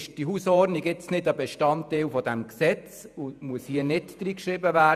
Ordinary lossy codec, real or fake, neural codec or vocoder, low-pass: none; fake; vocoder, 48 kHz, 128 mel bands, Vocos; 14.4 kHz